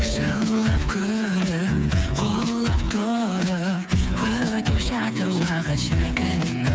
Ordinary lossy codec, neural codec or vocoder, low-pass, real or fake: none; codec, 16 kHz, 4 kbps, FreqCodec, smaller model; none; fake